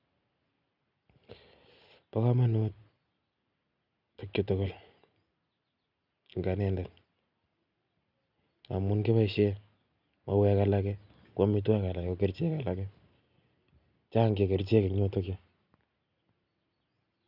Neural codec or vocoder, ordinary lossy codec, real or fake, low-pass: none; none; real; 5.4 kHz